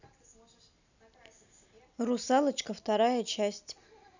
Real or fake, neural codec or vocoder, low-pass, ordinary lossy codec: real; none; 7.2 kHz; none